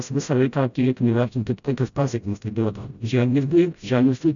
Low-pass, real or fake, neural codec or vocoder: 7.2 kHz; fake; codec, 16 kHz, 0.5 kbps, FreqCodec, smaller model